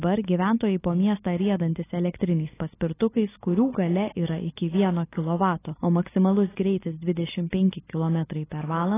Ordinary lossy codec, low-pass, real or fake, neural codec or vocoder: AAC, 16 kbps; 3.6 kHz; real; none